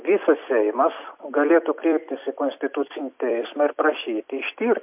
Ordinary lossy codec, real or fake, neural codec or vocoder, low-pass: MP3, 32 kbps; fake; vocoder, 44.1 kHz, 128 mel bands every 256 samples, BigVGAN v2; 3.6 kHz